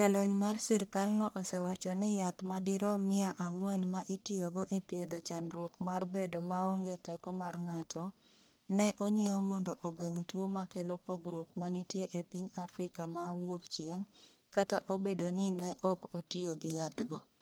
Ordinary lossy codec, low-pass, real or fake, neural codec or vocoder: none; none; fake; codec, 44.1 kHz, 1.7 kbps, Pupu-Codec